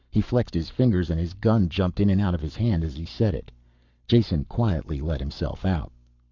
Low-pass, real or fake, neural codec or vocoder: 7.2 kHz; fake; codec, 44.1 kHz, 7.8 kbps, Pupu-Codec